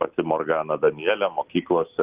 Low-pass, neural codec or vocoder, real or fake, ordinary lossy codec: 3.6 kHz; none; real; Opus, 32 kbps